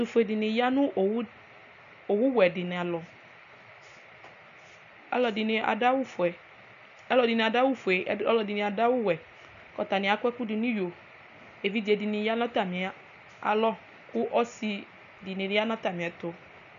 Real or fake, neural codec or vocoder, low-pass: real; none; 7.2 kHz